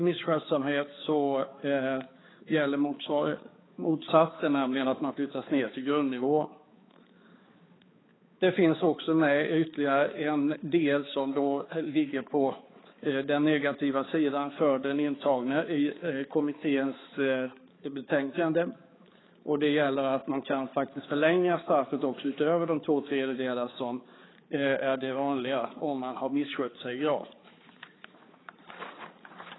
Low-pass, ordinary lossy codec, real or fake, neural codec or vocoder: 7.2 kHz; AAC, 16 kbps; fake; codec, 16 kHz, 4 kbps, X-Codec, HuBERT features, trained on balanced general audio